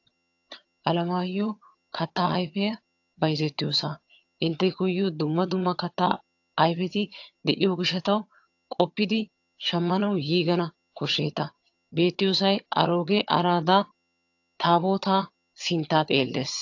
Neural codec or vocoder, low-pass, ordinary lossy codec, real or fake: vocoder, 22.05 kHz, 80 mel bands, HiFi-GAN; 7.2 kHz; AAC, 48 kbps; fake